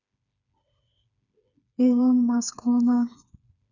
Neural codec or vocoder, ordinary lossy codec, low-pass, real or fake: codec, 16 kHz, 4 kbps, FreqCodec, smaller model; none; 7.2 kHz; fake